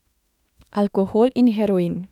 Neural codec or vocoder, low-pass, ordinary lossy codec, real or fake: autoencoder, 48 kHz, 32 numbers a frame, DAC-VAE, trained on Japanese speech; 19.8 kHz; none; fake